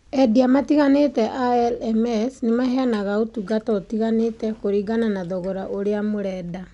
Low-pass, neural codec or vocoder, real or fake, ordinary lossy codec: 10.8 kHz; none; real; none